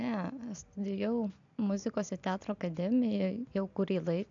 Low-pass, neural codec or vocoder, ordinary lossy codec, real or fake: 7.2 kHz; none; AAC, 64 kbps; real